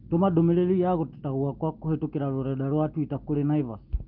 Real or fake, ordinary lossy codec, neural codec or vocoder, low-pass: real; Opus, 32 kbps; none; 5.4 kHz